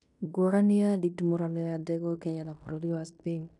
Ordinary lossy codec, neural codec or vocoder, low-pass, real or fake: none; codec, 16 kHz in and 24 kHz out, 0.9 kbps, LongCat-Audio-Codec, fine tuned four codebook decoder; 10.8 kHz; fake